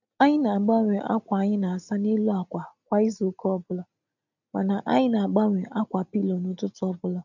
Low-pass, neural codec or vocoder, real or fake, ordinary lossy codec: 7.2 kHz; none; real; none